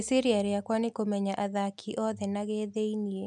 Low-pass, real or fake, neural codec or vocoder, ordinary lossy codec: 10.8 kHz; real; none; none